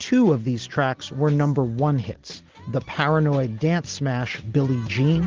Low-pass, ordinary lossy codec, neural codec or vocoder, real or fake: 7.2 kHz; Opus, 16 kbps; none; real